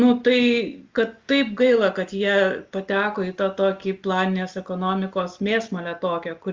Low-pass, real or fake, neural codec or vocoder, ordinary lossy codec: 7.2 kHz; real; none; Opus, 32 kbps